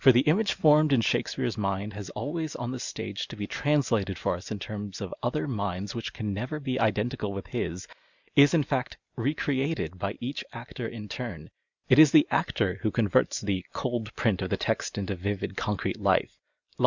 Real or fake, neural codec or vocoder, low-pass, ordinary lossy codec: real; none; 7.2 kHz; Opus, 64 kbps